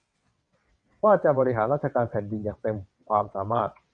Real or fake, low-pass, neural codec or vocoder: fake; 9.9 kHz; vocoder, 22.05 kHz, 80 mel bands, WaveNeXt